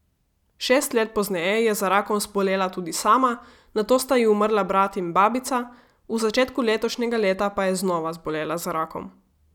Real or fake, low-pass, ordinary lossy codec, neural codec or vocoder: real; 19.8 kHz; none; none